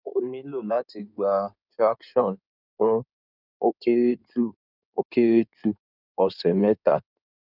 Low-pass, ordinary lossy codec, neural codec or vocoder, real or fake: 5.4 kHz; none; codec, 16 kHz in and 24 kHz out, 2.2 kbps, FireRedTTS-2 codec; fake